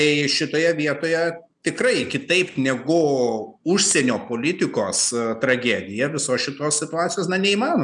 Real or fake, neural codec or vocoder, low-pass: real; none; 9.9 kHz